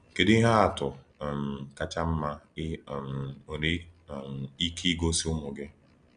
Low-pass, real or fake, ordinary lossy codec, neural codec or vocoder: 9.9 kHz; real; none; none